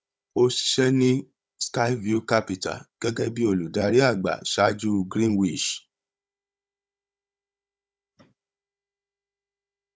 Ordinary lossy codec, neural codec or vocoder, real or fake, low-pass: none; codec, 16 kHz, 16 kbps, FunCodec, trained on Chinese and English, 50 frames a second; fake; none